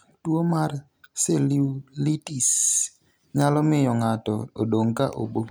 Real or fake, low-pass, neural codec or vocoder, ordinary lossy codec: real; none; none; none